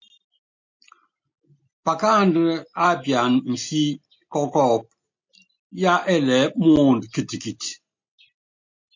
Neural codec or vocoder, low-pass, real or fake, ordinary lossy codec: none; 7.2 kHz; real; MP3, 48 kbps